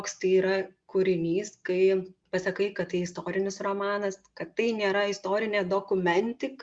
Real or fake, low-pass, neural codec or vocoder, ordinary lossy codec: real; 9.9 kHz; none; Opus, 64 kbps